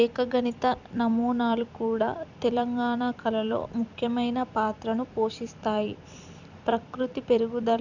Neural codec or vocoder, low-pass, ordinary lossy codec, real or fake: vocoder, 44.1 kHz, 128 mel bands every 256 samples, BigVGAN v2; 7.2 kHz; none; fake